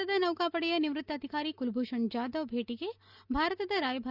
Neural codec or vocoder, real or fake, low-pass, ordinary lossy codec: none; real; 5.4 kHz; none